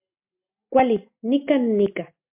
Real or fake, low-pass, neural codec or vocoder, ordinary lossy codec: real; 3.6 kHz; none; MP3, 32 kbps